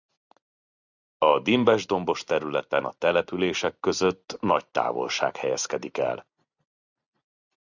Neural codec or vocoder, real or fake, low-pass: vocoder, 44.1 kHz, 128 mel bands every 256 samples, BigVGAN v2; fake; 7.2 kHz